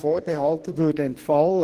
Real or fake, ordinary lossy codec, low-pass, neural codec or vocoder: fake; Opus, 16 kbps; 14.4 kHz; codec, 44.1 kHz, 2.6 kbps, DAC